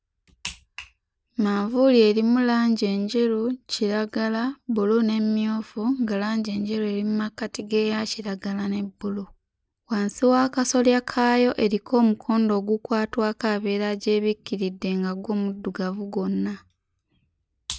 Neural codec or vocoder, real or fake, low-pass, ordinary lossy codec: none; real; none; none